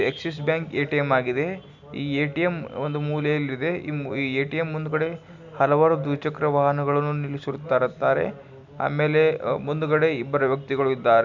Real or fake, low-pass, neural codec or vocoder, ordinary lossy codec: real; 7.2 kHz; none; none